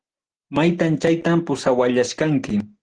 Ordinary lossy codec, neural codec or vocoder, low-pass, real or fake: Opus, 16 kbps; none; 9.9 kHz; real